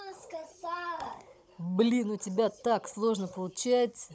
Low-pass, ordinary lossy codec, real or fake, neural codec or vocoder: none; none; fake; codec, 16 kHz, 16 kbps, FunCodec, trained on Chinese and English, 50 frames a second